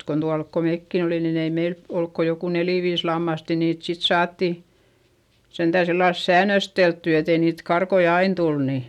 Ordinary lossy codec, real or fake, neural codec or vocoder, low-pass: none; real; none; 19.8 kHz